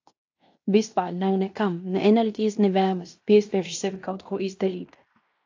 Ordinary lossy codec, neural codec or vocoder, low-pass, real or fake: AAC, 48 kbps; codec, 16 kHz in and 24 kHz out, 0.9 kbps, LongCat-Audio-Codec, fine tuned four codebook decoder; 7.2 kHz; fake